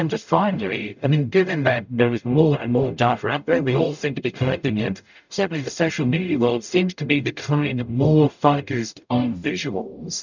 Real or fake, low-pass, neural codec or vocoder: fake; 7.2 kHz; codec, 44.1 kHz, 0.9 kbps, DAC